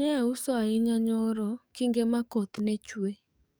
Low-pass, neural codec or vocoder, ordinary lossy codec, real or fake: none; codec, 44.1 kHz, 7.8 kbps, DAC; none; fake